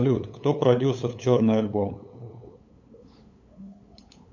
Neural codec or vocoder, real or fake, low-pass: codec, 16 kHz, 8 kbps, FunCodec, trained on LibriTTS, 25 frames a second; fake; 7.2 kHz